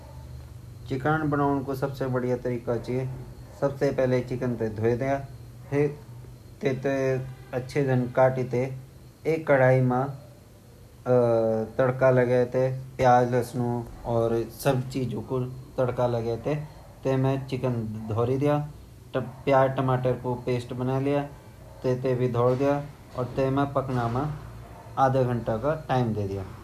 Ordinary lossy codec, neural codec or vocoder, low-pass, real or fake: none; none; 14.4 kHz; real